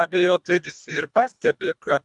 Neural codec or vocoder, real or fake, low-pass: codec, 24 kHz, 1.5 kbps, HILCodec; fake; 10.8 kHz